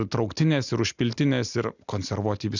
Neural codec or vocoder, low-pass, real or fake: none; 7.2 kHz; real